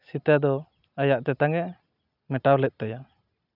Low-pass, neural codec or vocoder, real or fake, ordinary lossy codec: 5.4 kHz; none; real; none